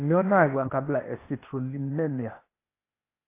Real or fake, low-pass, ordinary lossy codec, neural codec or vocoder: fake; 3.6 kHz; AAC, 24 kbps; codec, 16 kHz, 0.8 kbps, ZipCodec